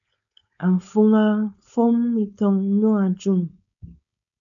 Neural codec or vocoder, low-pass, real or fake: codec, 16 kHz, 4.8 kbps, FACodec; 7.2 kHz; fake